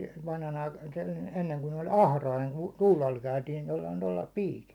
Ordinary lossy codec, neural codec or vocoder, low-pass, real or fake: none; none; 19.8 kHz; real